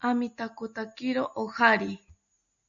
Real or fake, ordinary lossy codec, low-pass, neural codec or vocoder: real; AAC, 64 kbps; 7.2 kHz; none